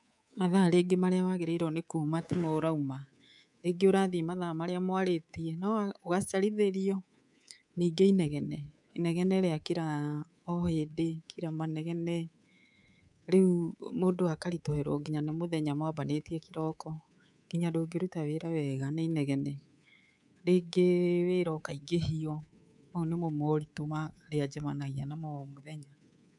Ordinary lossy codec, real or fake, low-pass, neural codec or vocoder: none; fake; 10.8 kHz; codec, 24 kHz, 3.1 kbps, DualCodec